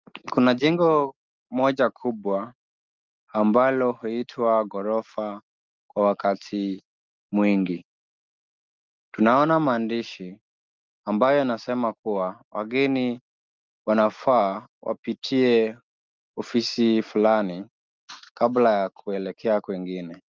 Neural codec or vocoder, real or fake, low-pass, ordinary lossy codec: none; real; 7.2 kHz; Opus, 32 kbps